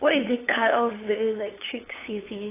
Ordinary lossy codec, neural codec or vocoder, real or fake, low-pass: AAC, 16 kbps; codec, 16 kHz, 8 kbps, FunCodec, trained on LibriTTS, 25 frames a second; fake; 3.6 kHz